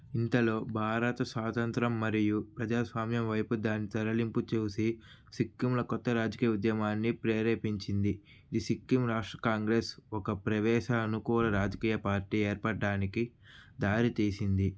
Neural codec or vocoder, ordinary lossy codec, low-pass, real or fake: none; none; none; real